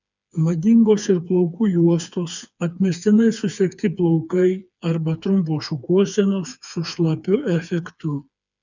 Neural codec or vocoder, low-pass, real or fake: codec, 16 kHz, 4 kbps, FreqCodec, smaller model; 7.2 kHz; fake